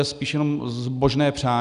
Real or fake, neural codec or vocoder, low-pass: real; none; 10.8 kHz